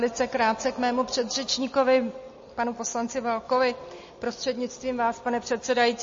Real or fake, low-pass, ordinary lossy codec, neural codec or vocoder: real; 7.2 kHz; MP3, 32 kbps; none